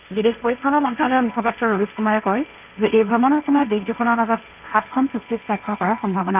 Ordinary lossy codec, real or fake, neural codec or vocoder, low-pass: none; fake; codec, 16 kHz, 1.1 kbps, Voila-Tokenizer; 3.6 kHz